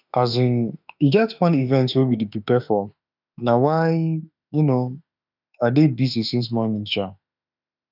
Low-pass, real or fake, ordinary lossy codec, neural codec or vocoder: 5.4 kHz; fake; none; autoencoder, 48 kHz, 32 numbers a frame, DAC-VAE, trained on Japanese speech